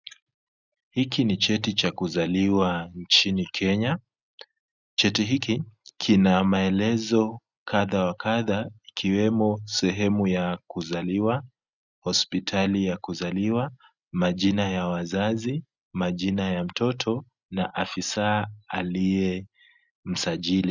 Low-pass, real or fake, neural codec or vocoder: 7.2 kHz; real; none